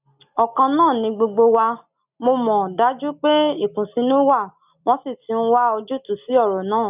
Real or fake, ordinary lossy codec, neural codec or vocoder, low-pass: real; none; none; 3.6 kHz